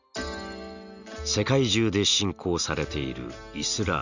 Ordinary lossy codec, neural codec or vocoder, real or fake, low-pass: none; none; real; 7.2 kHz